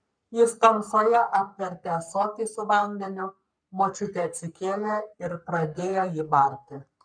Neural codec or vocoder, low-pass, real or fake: codec, 44.1 kHz, 3.4 kbps, Pupu-Codec; 9.9 kHz; fake